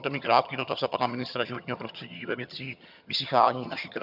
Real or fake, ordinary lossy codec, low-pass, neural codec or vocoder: fake; AAC, 48 kbps; 5.4 kHz; vocoder, 22.05 kHz, 80 mel bands, HiFi-GAN